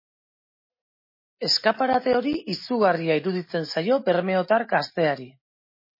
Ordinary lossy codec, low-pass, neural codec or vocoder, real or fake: MP3, 24 kbps; 5.4 kHz; none; real